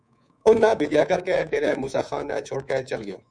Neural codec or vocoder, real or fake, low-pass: codec, 24 kHz, 3.1 kbps, DualCodec; fake; 9.9 kHz